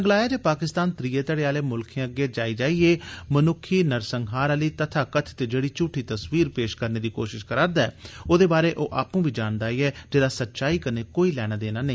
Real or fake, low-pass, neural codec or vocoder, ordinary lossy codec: real; none; none; none